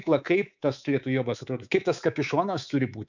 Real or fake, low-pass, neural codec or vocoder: fake; 7.2 kHz; codec, 24 kHz, 3.1 kbps, DualCodec